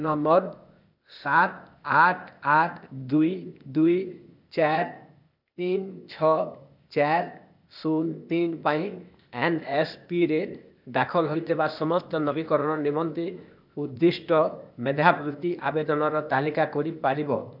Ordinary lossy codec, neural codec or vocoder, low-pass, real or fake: none; codec, 16 kHz, 0.8 kbps, ZipCodec; 5.4 kHz; fake